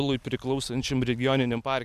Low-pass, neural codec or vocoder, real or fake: 14.4 kHz; none; real